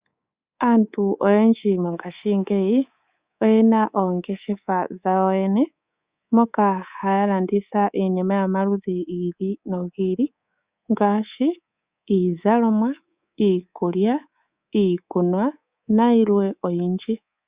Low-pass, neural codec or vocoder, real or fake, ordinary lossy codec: 3.6 kHz; codec, 24 kHz, 3.1 kbps, DualCodec; fake; Opus, 64 kbps